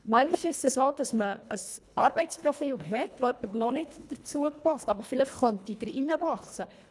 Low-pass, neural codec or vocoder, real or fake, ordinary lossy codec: none; codec, 24 kHz, 1.5 kbps, HILCodec; fake; none